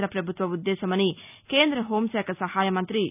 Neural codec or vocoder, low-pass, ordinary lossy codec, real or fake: none; 3.6 kHz; none; real